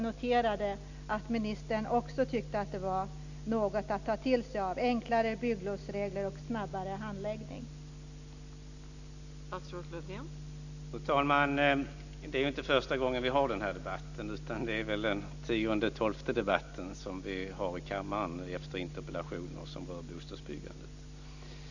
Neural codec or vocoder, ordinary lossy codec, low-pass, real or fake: none; none; 7.2 kHz; real